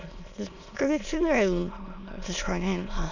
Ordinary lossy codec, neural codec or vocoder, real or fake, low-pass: none; autoencoder, 22.05 kHz, a latent of 192 numbers a frame, VITS, trained on many speakers; fake; 7.2 kHz